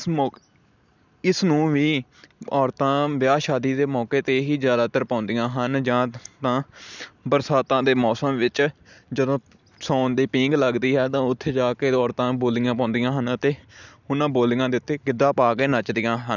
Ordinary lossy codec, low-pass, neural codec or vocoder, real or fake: none; 7.2 kHz; none; real